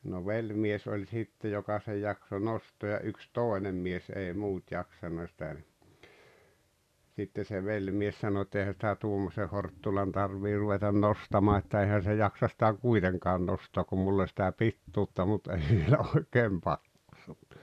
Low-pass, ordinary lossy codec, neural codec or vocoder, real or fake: 14.4 kHz; none; none; real